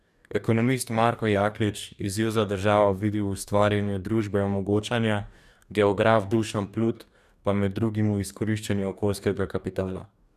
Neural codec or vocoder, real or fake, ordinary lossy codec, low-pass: codec, 44.1 kHz, 2.6 kbps, DAC; fake; none; 14.4 kHz